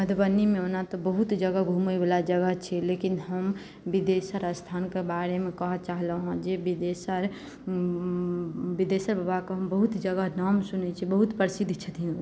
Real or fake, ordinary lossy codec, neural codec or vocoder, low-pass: real; none; none; none